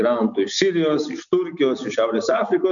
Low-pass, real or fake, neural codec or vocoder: 7.2 kHz; real; none